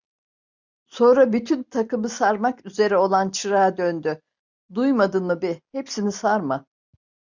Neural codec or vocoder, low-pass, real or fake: none; 7.2 kHz; real